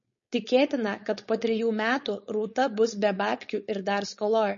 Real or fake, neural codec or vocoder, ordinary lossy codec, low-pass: fake; codec, 16 kHz, 4.8 kbps, FACodec; MP3, 32 kbps; 7.2 kHz